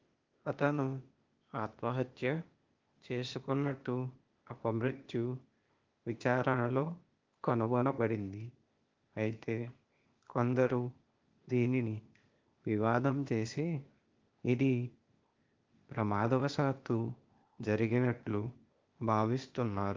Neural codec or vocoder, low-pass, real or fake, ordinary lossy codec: codec, 16 kHz, 0.8 kbps, ZipCodec; 7.2 kHz; fake; Opus, 32 kbps